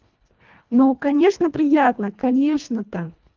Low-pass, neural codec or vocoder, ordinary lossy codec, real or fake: 7.2 kHz; codec, 24 kHz, 1.5 kbps, HILCodec; Opus, 32 kbps; fake